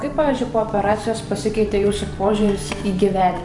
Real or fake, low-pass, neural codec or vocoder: fake; 10.8 kHz; vocoder, 44.1 kHz, 128 mel bands every 512 samples, BigVGAN v2